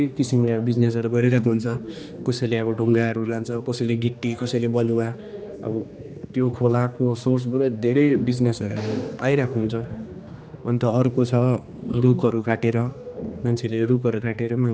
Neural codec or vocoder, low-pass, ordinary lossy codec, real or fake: codec, 16 kHz, 2 kbps, X-Codec, HuBERT features, trained on general audio; none; none; fake